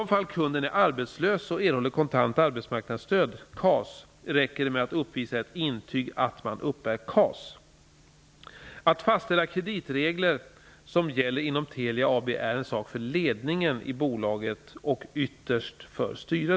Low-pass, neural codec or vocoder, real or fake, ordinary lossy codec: none; none; real; none